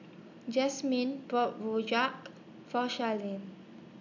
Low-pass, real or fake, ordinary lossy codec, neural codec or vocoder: 7.2 kHz; real; none; none